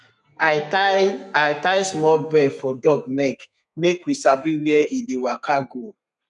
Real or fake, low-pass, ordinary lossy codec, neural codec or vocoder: fake; 10.8 kHz; none; codec, 32 kHz, 1.9 kbps, SNAC